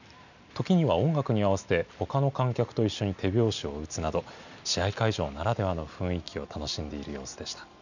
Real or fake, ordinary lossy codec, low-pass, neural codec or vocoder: real; none; 7.2 kHz; none